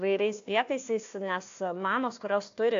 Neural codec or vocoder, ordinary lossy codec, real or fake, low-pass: codec, 16 kHz, 1 kbps, FunCodec, trained on Chinese and English, 50 frames a second; AAC, 48 kbps; fake; 7.2 kHz